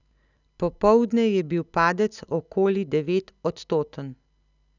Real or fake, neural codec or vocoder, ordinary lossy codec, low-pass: real; none; none; 7.2 kHz